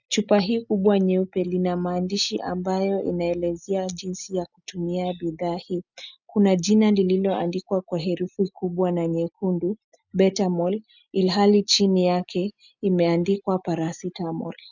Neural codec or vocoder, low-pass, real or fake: none; 7.2 kHz; real